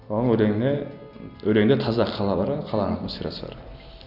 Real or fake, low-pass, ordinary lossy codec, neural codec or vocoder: real; 5.4 kHz; none; none